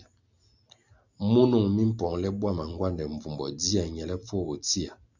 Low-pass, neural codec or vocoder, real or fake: 7.2 kHz; none; real